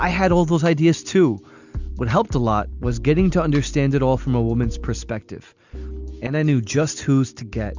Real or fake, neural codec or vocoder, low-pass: real; none; 7.2 kHz